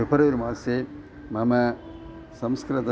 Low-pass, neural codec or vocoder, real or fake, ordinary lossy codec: none; none; real; none